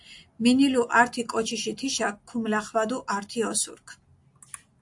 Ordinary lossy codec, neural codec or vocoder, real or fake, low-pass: AAC, 64 kbps; none; real; 10.8 kHz